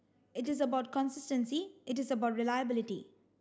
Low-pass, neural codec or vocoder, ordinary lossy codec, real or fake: none; none; none; real